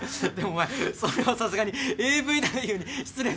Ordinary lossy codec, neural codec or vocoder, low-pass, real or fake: none; none; none; real